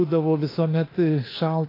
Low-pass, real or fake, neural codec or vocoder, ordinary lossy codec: 5.4 kHz; fake; autoencoder, 48 kHz, 32 numbers a frame, DAC-VAE, trained on Japanese speech; AAC, 24 kbps